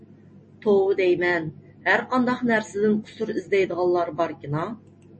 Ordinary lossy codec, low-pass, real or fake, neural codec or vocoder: MP3, 32 kbps; 10.8 kHz; real; none